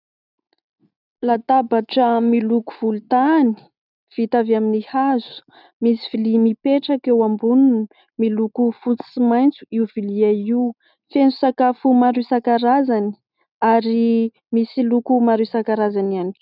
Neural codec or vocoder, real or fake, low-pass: none; real; 5.4 kHz